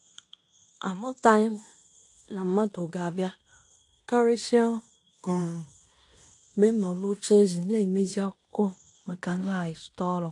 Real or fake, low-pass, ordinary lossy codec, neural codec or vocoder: fake; 10.8 kHz; AAC, 48 kbps; codec, 16 kHz in and 24 kHz out, 0.9 kbps, LongCat-Audio-Codec, fine tuned four codebook decoder